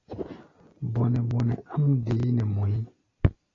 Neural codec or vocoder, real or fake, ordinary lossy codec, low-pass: none; real; AAC, 32 kbps; 7.2 kHz